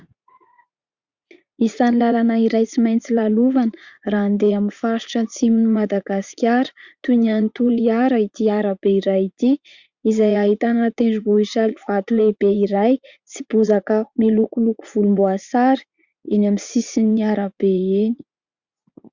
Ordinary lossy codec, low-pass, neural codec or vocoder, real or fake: Opus, 64 kbps; 7.2 kHz; vocoder, 44.1 kHz, 80 mel bands, Vocos; fake